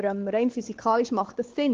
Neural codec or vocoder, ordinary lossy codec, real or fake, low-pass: codec, 16 kHz, 4 kbps, X-Codec, WavLM features, trained on Multilingual LibriSpeech; Opus, 16 kbps; fake; 7.2 kHz